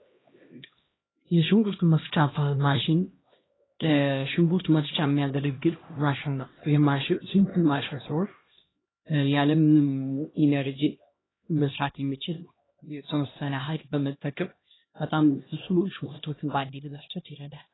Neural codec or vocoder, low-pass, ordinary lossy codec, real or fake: codec, 16 kHz, 1 kbps, X-Codec, HuBERT features, trained on LibriSpeech; 7.2 kHz; AAC, 16 kbps; fake